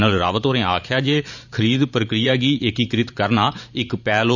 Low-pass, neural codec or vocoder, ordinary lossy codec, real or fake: 7.2 kHz; vocoder, 44.1 kHz, 128 mel bands every 512 samples, BigVGAN v2; none; fake